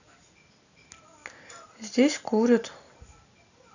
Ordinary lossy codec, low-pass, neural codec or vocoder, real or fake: none; 7.2 kHz; none; real